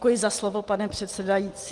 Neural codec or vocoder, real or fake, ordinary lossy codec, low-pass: none; real; Opus, 24 kbps; 10.8 kHz